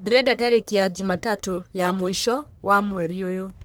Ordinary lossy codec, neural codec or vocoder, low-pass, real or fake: none; codec, 44.1 kHz, 1.7 kbps, Pupu-Codec; none; fake